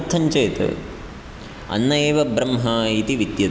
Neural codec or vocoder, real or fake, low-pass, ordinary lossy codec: none; real; none; none